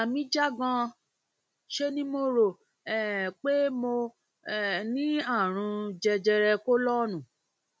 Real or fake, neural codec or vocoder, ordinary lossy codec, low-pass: real; none; none; none